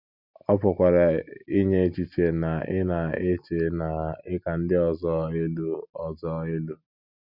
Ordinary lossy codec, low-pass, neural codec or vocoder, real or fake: none; 5.4 kHz; none; real